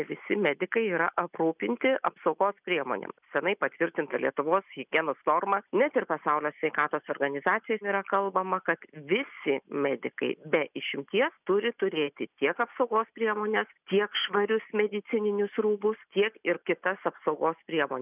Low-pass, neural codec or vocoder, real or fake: 3.6 kHz; none; real